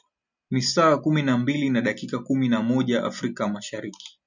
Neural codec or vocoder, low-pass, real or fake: none; 7.2 kHz; real